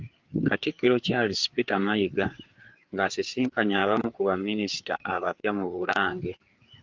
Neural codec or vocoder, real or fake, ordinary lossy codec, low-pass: codec, 16 kHz, 4 kbps, FreqCodec, larger model; fake; Opus, 32 kbps; 7.2 kHz